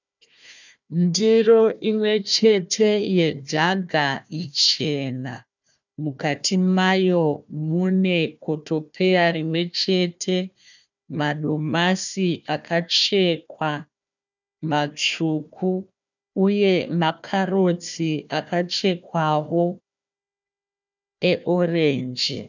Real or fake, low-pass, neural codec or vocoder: fake; 7.2 kHz; codec, 16 kHz, 1 kbps, FunCodec, trained on Chinese and English, 50 frames a second